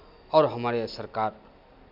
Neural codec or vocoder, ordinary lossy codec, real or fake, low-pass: none; MP3, 48 kbps; real; 5.4 kHz